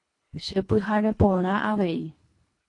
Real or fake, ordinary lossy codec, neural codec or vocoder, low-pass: fake; AAC, 48 kbps; codec, 24 kHz, 1.5 kbps, HILCodec; 10.8 kHz